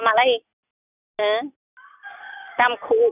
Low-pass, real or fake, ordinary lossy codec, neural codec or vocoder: 3.6 kHz; real; none; none